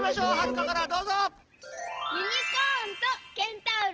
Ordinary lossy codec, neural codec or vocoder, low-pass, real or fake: Opus, 16 kbps; none; 7.2 kHz; real